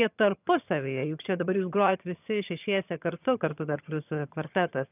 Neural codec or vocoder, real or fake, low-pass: vocoder, 22.05 kHz, 80 mel bands, HiFi-GAN; fake; 3.6 kHz